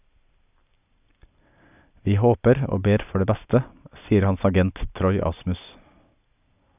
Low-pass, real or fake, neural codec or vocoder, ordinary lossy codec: 3.6 kHz; real; none; none